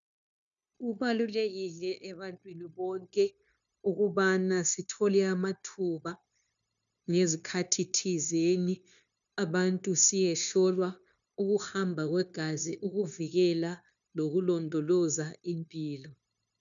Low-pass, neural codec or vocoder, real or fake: 7.2 kHz; codec, 16 kHz, 0.9 kbps, LongCat-Audio-Codec; fake